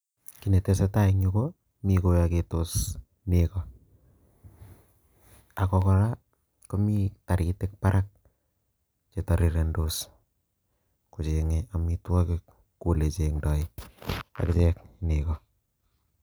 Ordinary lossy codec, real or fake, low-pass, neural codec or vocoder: none; real; none; none